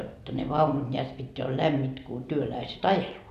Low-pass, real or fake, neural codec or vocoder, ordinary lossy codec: 14.4 kHz; real; none; none